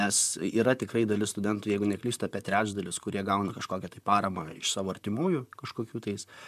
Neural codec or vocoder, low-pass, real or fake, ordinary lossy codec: vocoder, 44.1 kHz, 128 mel bands, Pupu-Vocoder; 14.4 kHz; fake; MP3, 96 kbps